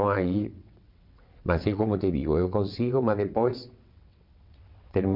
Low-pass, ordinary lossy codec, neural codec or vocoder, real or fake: 5.4 kHz; none; vocoder, 22.05 kHz, 80 mel bands, WaveNeXt; fake